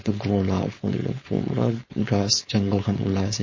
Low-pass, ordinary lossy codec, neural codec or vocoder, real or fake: 7.2 kHz; MP3, 32 kbps; codec, 16 kHz, 4.8 kbps, FACodec; fake